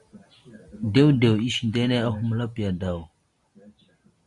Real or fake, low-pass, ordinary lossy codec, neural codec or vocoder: real; 10.8 kHz; Opus, 64 kbps; none